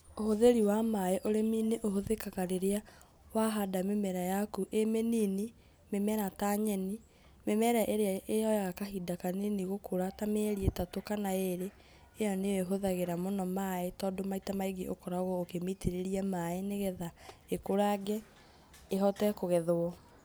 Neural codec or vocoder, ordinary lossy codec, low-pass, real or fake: none; none; none; real